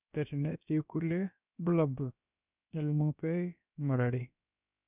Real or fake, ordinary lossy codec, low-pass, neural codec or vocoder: fake; none; 3.6 kHz; codec, 16 kHz, about 1 kbps, DyCAST, with the encoder's durations